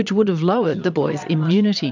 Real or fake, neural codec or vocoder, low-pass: fake; vocoder, 22.05 kHz, 80 mel bands, Vocos; 7.2 kHz